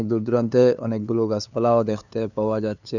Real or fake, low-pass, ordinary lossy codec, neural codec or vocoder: fake; 7.2 kHz; none; codec, 16 kHz, 2 kbps, FunCodec, trained on LibriTTS, 25 frames a second